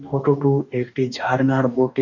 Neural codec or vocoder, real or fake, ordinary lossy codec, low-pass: codec, 44.1 kHz, 2.6 kbps, DAC; fake; none; 7.2 kHz